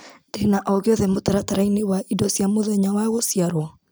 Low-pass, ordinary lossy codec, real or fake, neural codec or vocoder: none; none; real; none